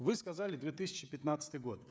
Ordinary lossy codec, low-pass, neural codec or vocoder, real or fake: none; none; codec, 16 kHz, 16 kbps, FreqCodec, smaller model; fake